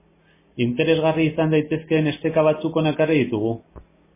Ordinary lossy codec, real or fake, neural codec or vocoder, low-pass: MP3, 16 kbps; real; none; 3.6 kHz